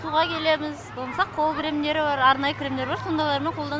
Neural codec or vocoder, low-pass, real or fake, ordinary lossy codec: none; none; real; none